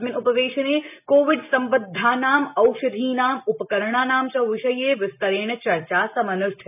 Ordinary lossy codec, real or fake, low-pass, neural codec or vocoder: none; real; 3.6 kHz; none